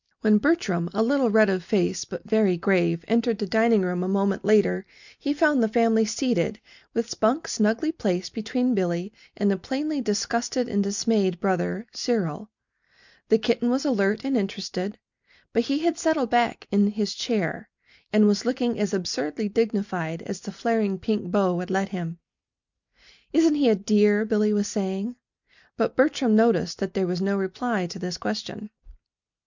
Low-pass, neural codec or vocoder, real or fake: 7.2 kHz; none; real